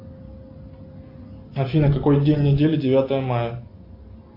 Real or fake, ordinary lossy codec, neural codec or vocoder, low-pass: real; AAC, 32 kbps; none; 5.4 kHz